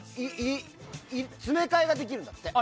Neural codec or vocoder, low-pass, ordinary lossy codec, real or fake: none; none; none; real